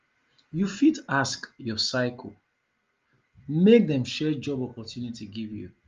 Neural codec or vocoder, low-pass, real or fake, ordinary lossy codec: none; 7.2 kHz; real; Opus, 32 kbps